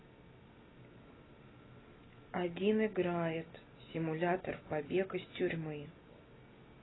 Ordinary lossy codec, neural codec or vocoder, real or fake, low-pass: AAC, 16 kbps; none; real; 7.2 kHz